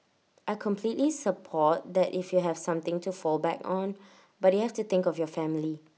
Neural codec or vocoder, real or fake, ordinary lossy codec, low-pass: none; real; none; none